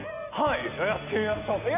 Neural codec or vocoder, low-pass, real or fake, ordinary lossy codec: none; 3.6 kHz; real; none